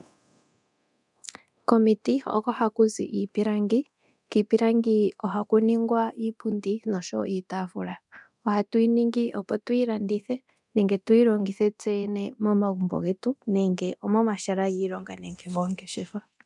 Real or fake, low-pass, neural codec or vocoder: fake; 10.8 kHz; codec, 24 kHz, 0.9 kbps, DualCodec